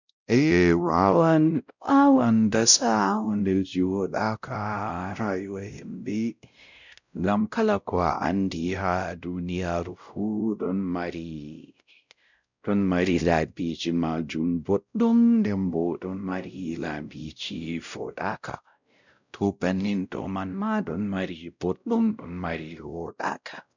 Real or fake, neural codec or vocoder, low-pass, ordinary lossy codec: fake; codec, 16 kHz, 0.5 kbps, X-Codec, WavLM features, trained on Multilingual LibriSpeech; 7.2 kHz; none